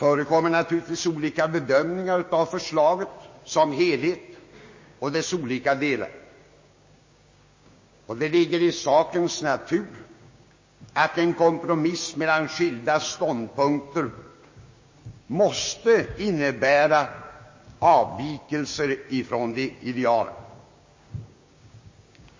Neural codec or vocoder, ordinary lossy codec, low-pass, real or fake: codec, 16 kHz, 6 kbps, DAC; MP3, 32 kbps; 7.2 kHz; fake